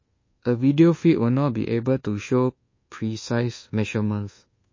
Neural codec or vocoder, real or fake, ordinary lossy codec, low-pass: codec, 24 kHz, 1.2 kbps, DualCodec; fake; MP3, 32 kbps; 7.2 kHz